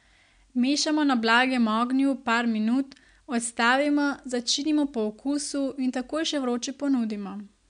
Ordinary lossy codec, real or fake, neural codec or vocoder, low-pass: MP3, 64 kbps; real; none; 9.9 kHz